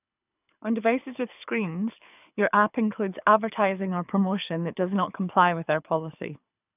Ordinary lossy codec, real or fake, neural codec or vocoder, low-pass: none; fake; codec, 24 kHz, 6 kbps, HILCodec; 3.6 kHz